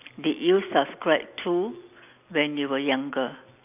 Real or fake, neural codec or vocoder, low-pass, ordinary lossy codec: real; none; 3.6 kHz; none